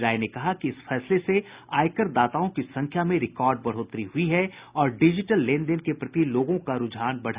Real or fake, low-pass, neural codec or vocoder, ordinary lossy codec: real; 3.6 kHz; none; Opus, 64 kbps